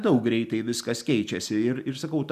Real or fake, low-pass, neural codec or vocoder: real; 14.4 kHz; none